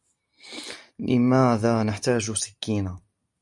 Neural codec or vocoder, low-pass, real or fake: none; 10.8 kHz; real